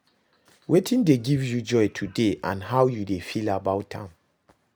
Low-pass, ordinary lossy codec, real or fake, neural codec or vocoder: none; none; real; none